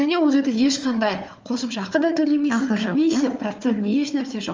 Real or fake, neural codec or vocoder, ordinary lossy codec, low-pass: fake; codec, 16 kHz, 4 kbps, FunCodec, trained on Chinese and English, 50 frames a second; Opus, 24 kbps; 7.2 kHz